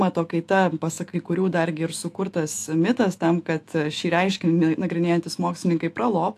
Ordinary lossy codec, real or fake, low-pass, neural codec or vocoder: AAC, 64 kbps; fake; 14.4 kHz; autoencoder, 48 kHz, 128 numbers a frame, DAC-VAE, trained on Japanese speech